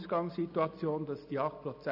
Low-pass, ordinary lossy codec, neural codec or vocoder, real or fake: 5.4 kHz; none; none; real